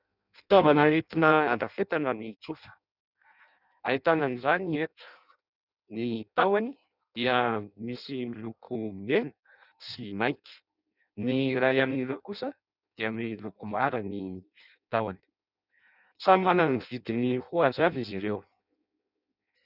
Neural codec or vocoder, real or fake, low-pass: codec, 16 kHz in and 24 kHz out, 0.6 kbps, FireRedTTS-2 codec; fake; 5.4 kHz